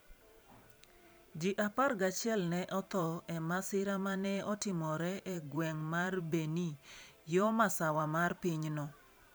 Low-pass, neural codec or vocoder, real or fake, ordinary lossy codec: none; vocoder, 44.1 kHz, 128 mel bands every 512 samples, BigVGAN v2; fake; none